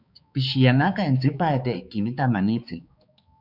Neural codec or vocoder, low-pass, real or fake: codec, 16 kHz, 4 kbps, X-Codec, HuBERT features, trained on balanced general audio; 5.4 kHz; fake